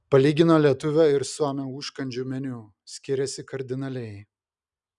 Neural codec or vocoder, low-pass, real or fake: none; 10.8 kHz; real